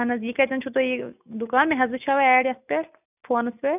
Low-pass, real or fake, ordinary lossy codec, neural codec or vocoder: 3.6 kHz; real; none; none